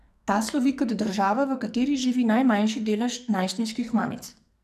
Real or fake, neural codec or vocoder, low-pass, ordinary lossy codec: fake; codec, 44.1 kHz, 2.6 kbps, SNAC; 14.4 kHz; none